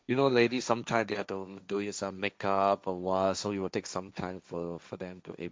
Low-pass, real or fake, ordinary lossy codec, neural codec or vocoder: none; fake; none; codec, 16 kHz, 1.1 kbps, Voila-Tokenizer